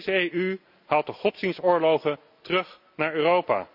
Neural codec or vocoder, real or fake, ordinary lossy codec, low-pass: none; real; AAC, 48 kbps; 5.4 kHz